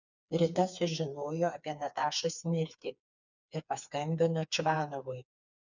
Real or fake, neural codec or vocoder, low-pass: fake; codec, 16 kHz, 4 kbps, FreqCodec, smaller model; 7.2 kHz